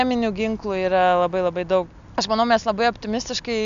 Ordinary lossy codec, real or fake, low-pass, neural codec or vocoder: AAC, 96 kbps; real; 7.2 kHz; none